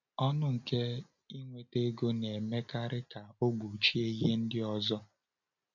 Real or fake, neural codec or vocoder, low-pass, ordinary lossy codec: real; none; 7.2 kHz; none